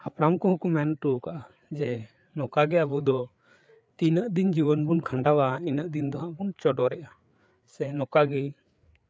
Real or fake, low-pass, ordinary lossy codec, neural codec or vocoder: fake; none; none; codec, 16 kHz, 4 kbps, FreqCodec, larger model